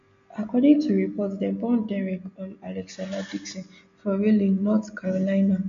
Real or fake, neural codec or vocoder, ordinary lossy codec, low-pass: real; none; MP3, 64 kbps; 7.2 kHz